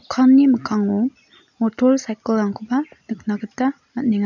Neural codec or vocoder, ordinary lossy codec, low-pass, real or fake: none; none; 7.2 kHz; real